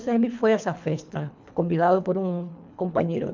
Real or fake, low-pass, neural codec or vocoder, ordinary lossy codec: fake; 7.2 kHz; codec, 24 kHz, 3 kbps, HILCodec; MP3, 64 kbps